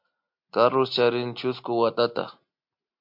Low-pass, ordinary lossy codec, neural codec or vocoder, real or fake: 5.4 kHz; MP3, 48 kbps; none; real